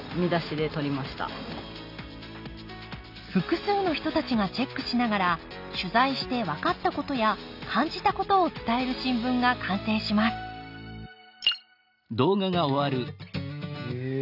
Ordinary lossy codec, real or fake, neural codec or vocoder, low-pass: none; real; none; 5.4 kHz